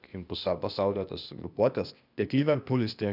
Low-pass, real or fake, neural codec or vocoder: 5.4 kHz; fake; codec, 16 kHz, 0.8 kbps, ZipCodec